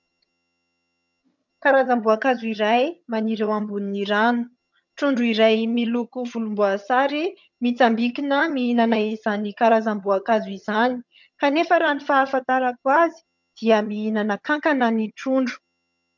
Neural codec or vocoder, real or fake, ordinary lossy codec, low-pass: vocoder, 22.05 kHz, 80 mel bands, HiFi-GAN; fake; MP3, 64 kbps; 7.2 kHz